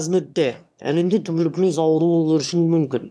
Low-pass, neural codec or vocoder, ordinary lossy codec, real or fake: none; autoencoder, 22.05 kHz, a latent of 192 numbers a frame, VITS, trained on one speaker; none; fake